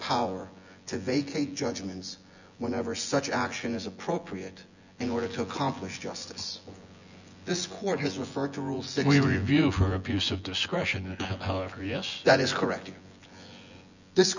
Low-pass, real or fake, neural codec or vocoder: 7.2 kHz; fake; vocoder, 24 kHz, 100 mel bands, Vocos